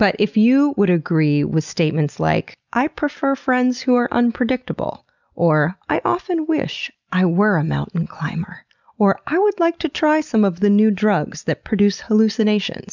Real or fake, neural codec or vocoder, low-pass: real; none; 7.2 kHz